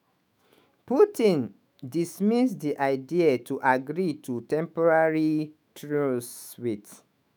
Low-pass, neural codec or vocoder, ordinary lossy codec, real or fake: none; autoencoder, 48 kHz, 128 numbers a frame, DAC-VAE, trained on Japanese speech; none; fake